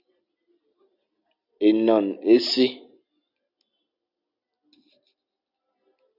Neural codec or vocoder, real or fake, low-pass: none; real; 5.4 kHz